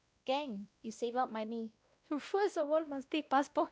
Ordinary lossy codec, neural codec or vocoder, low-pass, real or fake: none; codec, 16 kHz, 1 kbps, X-Codec, WavLM features, trained on Multilingual LibriSpeech; none; fake